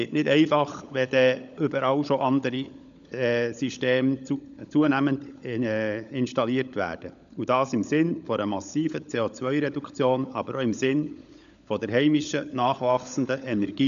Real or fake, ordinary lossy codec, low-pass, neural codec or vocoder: fake; none; 7.2 kHz; codec, 16 kHz, 16 kbps, FunCodec, trained on LibriTTS, 50 frames a second